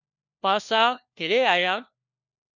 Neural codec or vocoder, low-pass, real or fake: codec, 16 kHz, 1 kbps, FunCodec, trained on LibriTTS, 50 frames a second; 7.2 kHz; fake